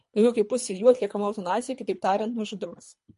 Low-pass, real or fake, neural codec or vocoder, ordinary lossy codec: 10.8 kHz; fake; codec, 24 kHz, 3 kbps, HILCodec; MP3, 48 kbps